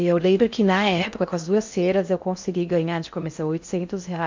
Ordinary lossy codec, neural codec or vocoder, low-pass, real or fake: MP3, 64 kbps; codec, 16 kHz in and 24 kHz out, 0.6 kbps, FocalCodec, streaming, 4096 codes; 7.2 kHz; fake